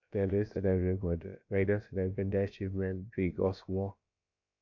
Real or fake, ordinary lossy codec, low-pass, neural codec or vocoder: fake; MP3, 64 kbps; 7.2 kHz; codec, 16 kHz, about 1 kbps, DyCAST, with the encoder's durations